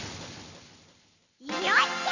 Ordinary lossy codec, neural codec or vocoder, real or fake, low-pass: none; none; real; 7.2 kHz